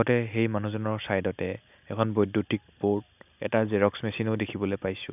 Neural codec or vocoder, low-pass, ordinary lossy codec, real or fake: none; 3.6 kHz; none; real